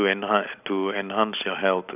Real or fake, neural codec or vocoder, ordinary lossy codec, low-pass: fake; vocoder, 44.1 kHz, 128 mel bands every 256 samples, BigVGAN v2; none; 3.6 kHz